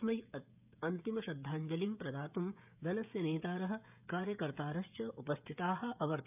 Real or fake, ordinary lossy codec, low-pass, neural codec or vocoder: fake; none; 3.6 kHz; codec, 16 kHz, 16 kbps, FreqCodec, smaller model